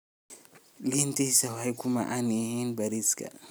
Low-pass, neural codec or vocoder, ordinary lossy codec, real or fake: none; none; none; real